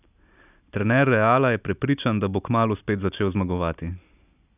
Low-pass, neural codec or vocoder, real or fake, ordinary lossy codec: 3.6 kHz; none; real; none